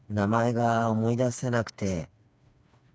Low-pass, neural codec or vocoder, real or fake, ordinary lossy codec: none; codec, 16 kHz, 4 kbps, FreqCodec, smaller model; fake; none